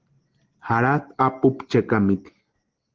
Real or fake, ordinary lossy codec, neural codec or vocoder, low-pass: real; Opus, 16 kbps; none; 7.2 kHz